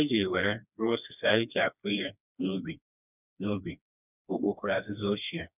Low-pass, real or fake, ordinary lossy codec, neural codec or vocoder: 3.6 kHz; fake; none; codec, 16 kHz, 2 kbps, FreqCodec, smaller model